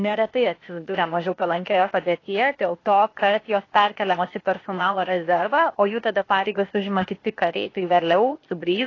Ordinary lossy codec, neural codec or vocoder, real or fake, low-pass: AAC, 32 kbps; codec, 16 kHz, 0.8 kbps, ZipCodec; fake; 7.2 kHz